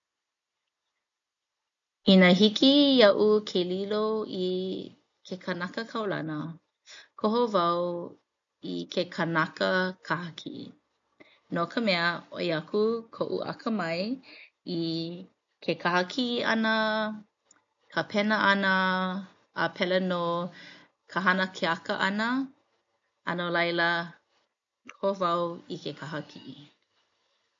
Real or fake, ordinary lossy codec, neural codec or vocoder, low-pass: real; MP3, 48 kbps; none; 7.2 kHz